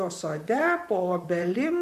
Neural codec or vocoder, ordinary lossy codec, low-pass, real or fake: vocoder, 44.1 kHz, 128 mel bands, Pupu-Vocoder; MP3, 96 kbps; 14.4 kHz; fake